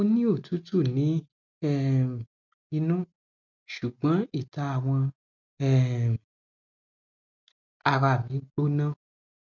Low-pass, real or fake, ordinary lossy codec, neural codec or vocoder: 7.2 kHz; real; none; none